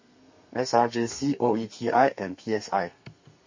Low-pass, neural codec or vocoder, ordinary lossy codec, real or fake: 7.2 kHz; codec, 32 kHz, 1.9 kbps, SNAC; MP3, 32 kbps; fake